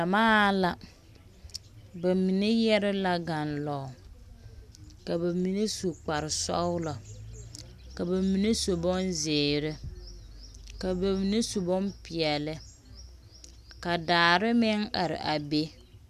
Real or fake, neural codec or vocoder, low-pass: real; none; 14.4 kHz